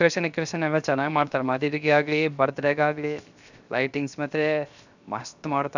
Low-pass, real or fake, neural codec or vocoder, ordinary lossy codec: 7.2 kHz; fake; codec, 16 kHz, 0.7 kbps, FocalCodec; none